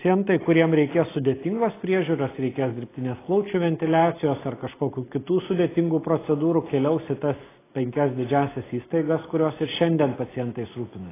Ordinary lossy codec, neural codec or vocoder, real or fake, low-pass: AAC, 16 kbps; none; real; 3.6 kHz